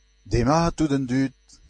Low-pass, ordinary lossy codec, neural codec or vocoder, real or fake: 10.8 kHz; MP3, 48 kbps; none; real